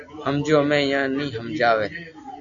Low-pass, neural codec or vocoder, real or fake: 7.2 kHz; none; real